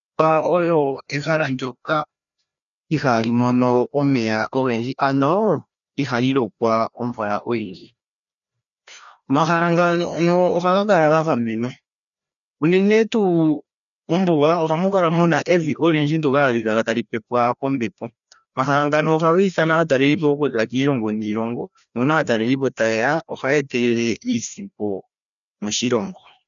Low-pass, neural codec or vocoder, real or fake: 7.2 kHz; codec, 16 kHz, 1 kbps, FreqCodec, larger model; fake